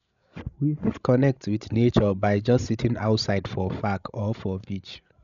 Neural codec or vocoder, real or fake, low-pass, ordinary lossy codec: codec, 16 kHz, 16 kbps, FreqCodec, larger model; fake; 7.2 kHz; none